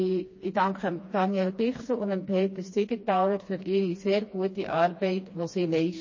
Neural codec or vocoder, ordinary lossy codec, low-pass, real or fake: codec, 16 kHz, 2 kbps, FreqCodec, smaller model; MP3, 32 kbps; 7.2 kHz; fake